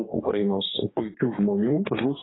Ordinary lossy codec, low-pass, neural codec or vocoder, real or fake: AAC, 16 kbps; 7.2 kHz; codec, 44.1 kHz, 2.6 kbps, DAC; fake